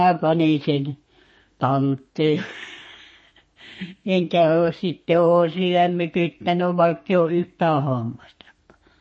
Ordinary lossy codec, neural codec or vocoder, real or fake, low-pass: MP3, 32 kbps; codec, 32 kHz, 1.9 kbps, SNAC; fake; 9.9 kHz